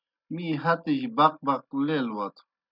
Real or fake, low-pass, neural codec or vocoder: real; 5.4 kHz; none